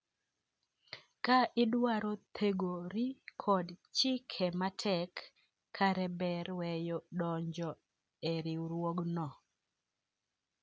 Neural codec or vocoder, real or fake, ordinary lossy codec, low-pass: none; real; none; none